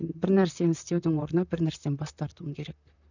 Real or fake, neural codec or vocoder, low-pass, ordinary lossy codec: fake; vocoder, 44.1 kHz, 128 mel bands, Pupu-Vocoder; 7.2 kHz; none